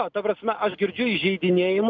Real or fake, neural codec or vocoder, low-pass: real; none; 7.2 kHz